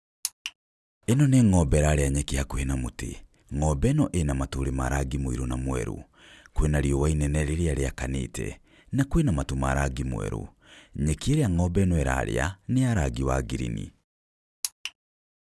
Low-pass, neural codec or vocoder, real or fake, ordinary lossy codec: none; none; real; none